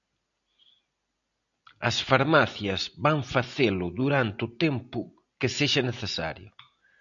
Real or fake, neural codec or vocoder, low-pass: real; none; 7.2 kHz